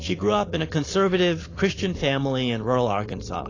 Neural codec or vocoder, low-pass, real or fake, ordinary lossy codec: codec, 16 kHz, 4.8 kbps, FACodec; 7.2 kHz; fake; AAC, 32 kbps